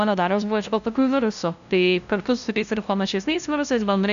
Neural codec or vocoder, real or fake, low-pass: codec, 16 kHz, 0.5 kbps, FunCodec, trained on LibriTTS, 25 frames a second; fake; 7.2 kHz